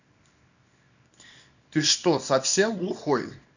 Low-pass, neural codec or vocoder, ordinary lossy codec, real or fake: 7.2 kHz; codec, 24 kHz, 0.9 kbps, WavTokenizer, small release; none; fake